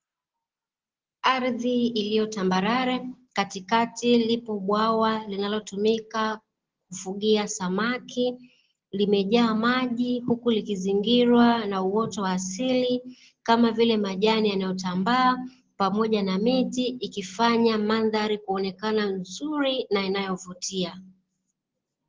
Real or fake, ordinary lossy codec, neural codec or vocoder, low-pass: real; Opus, 16 kbps; none; 7.2 kHz